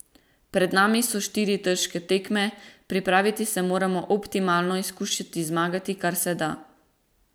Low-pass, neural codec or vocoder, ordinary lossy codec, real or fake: none; vocoder, 44.1 kHz, 128 mel bands every 256 samples, BigVGAN v2; none; fake